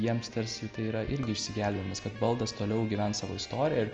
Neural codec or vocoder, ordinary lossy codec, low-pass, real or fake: none; Opus, 24 kbps; 7.2 kHz; real